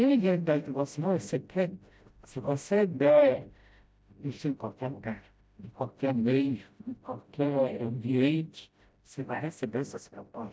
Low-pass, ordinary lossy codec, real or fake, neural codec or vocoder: none; none; fake; codec, 16 kHz, 0.5 kbps, FreqCodec, smaller model